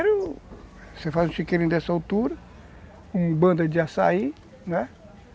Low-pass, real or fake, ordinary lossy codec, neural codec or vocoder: none; real; none; none